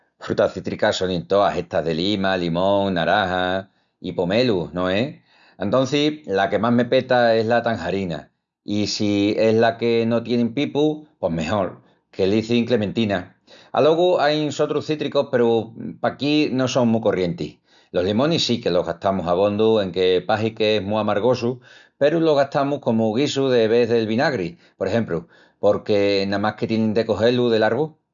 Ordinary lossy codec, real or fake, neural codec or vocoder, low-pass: none; real; none; 7.2 kHz